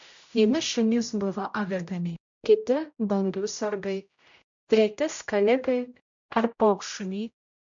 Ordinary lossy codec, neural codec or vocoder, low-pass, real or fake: MP3, 64 kbps; codec, 16 kHz, 0.5 kbps, X-Codec, HuBERT features, trained on general audio; 7.2 kHz; fake